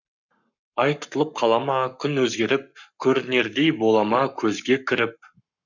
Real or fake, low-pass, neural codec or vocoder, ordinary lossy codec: fake; 7.2 kHz; codec, 44.1 kHz, 7.8 kbps, Pupu-Codec; none